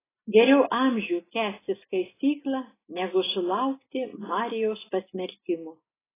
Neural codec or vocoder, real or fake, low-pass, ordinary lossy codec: none; real; 3.6 kHz; AAC, 16 kbps